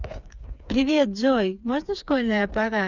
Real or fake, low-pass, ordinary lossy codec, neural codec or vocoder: fake; 7.2 kHz; none; codec, 16 kHz, 4 kbps, FreqCodec, smaller model